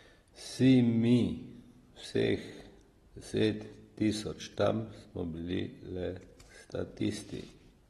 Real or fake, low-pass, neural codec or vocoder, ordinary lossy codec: real; 19.8 kHz; none; AAC, 32 kbps